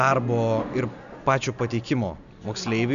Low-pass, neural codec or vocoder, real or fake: 7.2 kHz; none; real